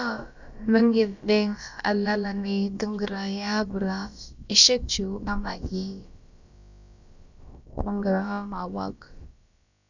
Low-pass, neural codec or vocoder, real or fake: 7.2 kHz; codec, 16 kHz, about 1 kbps, DyCAST, with the encoder's durations; fake